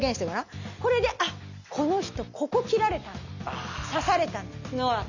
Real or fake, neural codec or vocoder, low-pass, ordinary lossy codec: real; none; 7.2 kHz; none